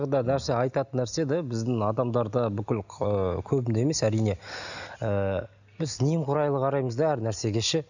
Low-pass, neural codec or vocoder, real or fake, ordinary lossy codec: 7.2 kHz; none; real; none